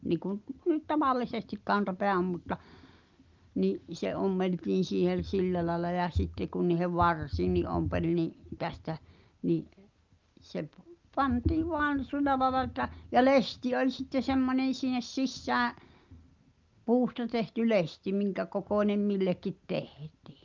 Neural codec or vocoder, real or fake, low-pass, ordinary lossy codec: none; real; 7.2 kHz; Opus, 32 kbps